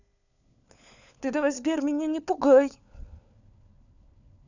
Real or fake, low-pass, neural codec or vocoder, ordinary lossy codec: fake; 7.2 kHz; codec, 16 kHz, 4 kbps, FunCodec, trained on LibriTTS, 50 frames a second; none